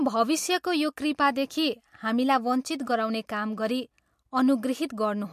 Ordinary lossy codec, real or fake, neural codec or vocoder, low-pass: MP3, 64 kbps; real; none; 14.4 kHz